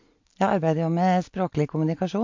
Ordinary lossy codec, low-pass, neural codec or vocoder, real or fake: MP3, 48 kbps; 7.2 kHz; none; real